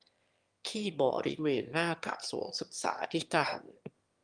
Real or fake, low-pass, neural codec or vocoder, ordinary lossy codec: fake; 9.9 kHz; autoencoder, 22.05 kHz, a latent of 192 numbers a frame, VITS, trained on one speaker; Opus, 32 kbps